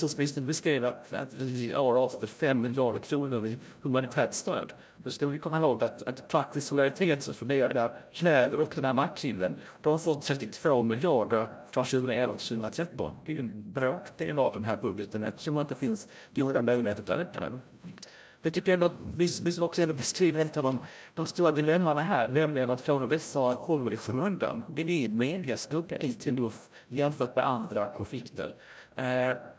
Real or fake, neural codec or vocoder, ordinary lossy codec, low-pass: fake; codec, 16 kHz, 0.5 kbps, FreqCodec, larger model; none; none